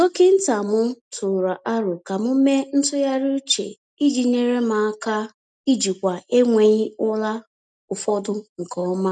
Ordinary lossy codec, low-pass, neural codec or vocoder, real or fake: none; none; none; real